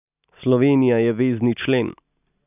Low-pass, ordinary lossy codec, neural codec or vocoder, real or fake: 3.6 kHz; none; none; real